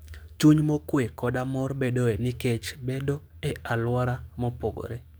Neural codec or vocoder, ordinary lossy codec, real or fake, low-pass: codec, 44.1 kHz, 7.8 kbps, DAC; none; fake; none